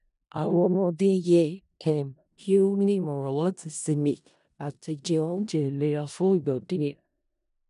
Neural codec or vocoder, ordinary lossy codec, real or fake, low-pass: codec, 16 kHz in and 24 kHz out, 0.4 kbps, LongCat-Audio-Codec, four codebook decoder; none; fake; 10.8 kHz